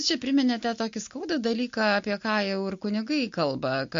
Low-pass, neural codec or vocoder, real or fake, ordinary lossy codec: 7.2 kHz; none; real; MP3, 48 kbps